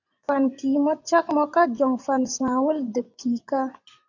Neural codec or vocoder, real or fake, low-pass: vocoder, 24 kHz, 100 mel bands, Vocos; fake; 7.2 kHz